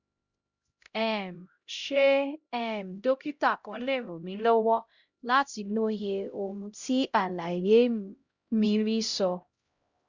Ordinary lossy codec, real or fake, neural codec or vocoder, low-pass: Opus, 64 kbps; fake; codec, 16 kHz, 0.5 kbps, X-Codec, HuBERT features, trained on LibriSpeech; 7.2 kHz